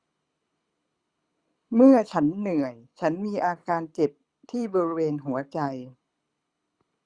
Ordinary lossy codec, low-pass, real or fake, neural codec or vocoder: Opus, 64 kbps; 9.9 kHz; fake; codec, 24 kHz, 6 kbps, HILCodec